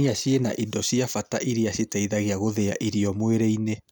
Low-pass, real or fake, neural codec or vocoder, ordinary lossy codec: none; real; none; none